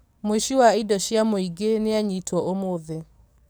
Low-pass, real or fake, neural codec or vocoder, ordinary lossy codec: none; fake; codec, 44.1 kHz, 7.8 kbps, DAC; none